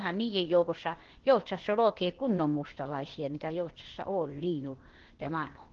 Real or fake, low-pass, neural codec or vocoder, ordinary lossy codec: fake; 7.2 kHz; codec, 16 kHz, 0.8 kbps, ZipCodec; Opus, 16 kbps